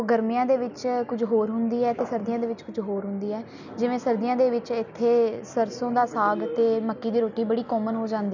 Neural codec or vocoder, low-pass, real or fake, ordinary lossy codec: none; 7.2 kHz; real; none